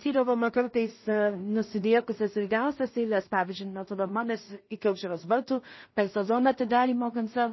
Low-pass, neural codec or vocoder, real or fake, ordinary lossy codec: 7.2 kHz; codec, 16 kHz in and 24 kHz out, 0.4 kbps, LongCat-Audio-Codec, two codebook decoder; fake; MP3, 24 kbps